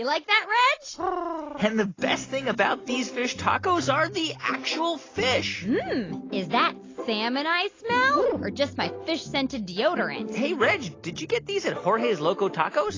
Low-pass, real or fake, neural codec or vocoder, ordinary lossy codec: 7.2 kHz; real; none; AAC, 32 kbps